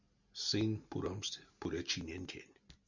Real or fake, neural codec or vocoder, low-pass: real; none; 7.2 kHz